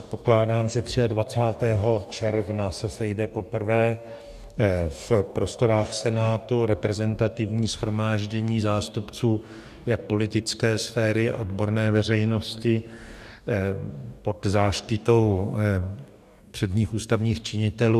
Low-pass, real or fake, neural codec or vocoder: 14.4 kHz; fake; codec, 44.1 kHz, 2.6 kbps, DAC